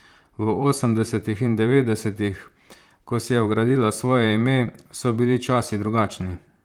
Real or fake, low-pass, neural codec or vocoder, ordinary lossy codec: fake; 19.8 kHz; vocoder, 44.1 kHz, 128 mel bands, Pupu-Vocoder; Opus, 32 kbps